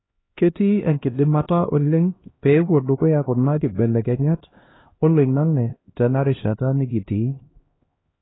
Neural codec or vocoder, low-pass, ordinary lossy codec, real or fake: codec, 16 kHz, 1 kbps, X-Codec, HuBERT features, trained on LibriSpeech; 7.2 kHz; AAC, 16 kbps; fake